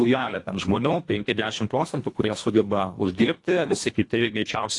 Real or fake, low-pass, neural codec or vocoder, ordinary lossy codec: fake; 10.8 kHz; codec, 24 kHz, 1.5 kbps, HILCodec; AAC, 48 kbps